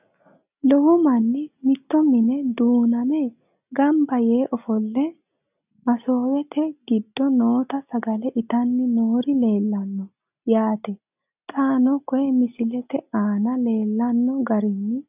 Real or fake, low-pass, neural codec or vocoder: real; 3.6 kHz; none